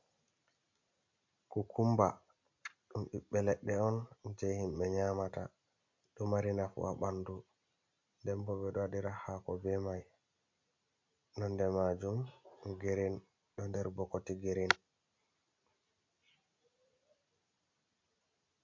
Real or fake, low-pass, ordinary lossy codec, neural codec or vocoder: real; 7.2 kHz; MP3, 48 kbps; none